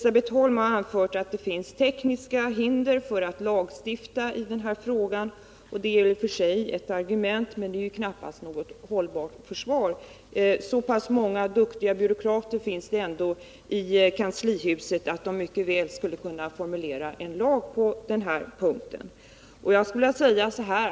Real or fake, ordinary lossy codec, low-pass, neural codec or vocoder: real; none; none; none